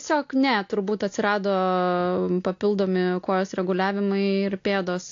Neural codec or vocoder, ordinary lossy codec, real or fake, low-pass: none; AAC, 48 kbps; real; 7.2 kHz